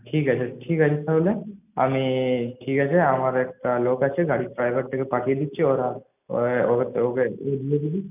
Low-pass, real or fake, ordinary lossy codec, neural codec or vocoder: 3.6 kHz; real; none; none